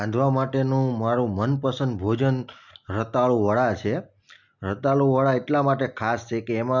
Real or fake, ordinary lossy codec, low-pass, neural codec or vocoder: real; none; 7.2 kHz; none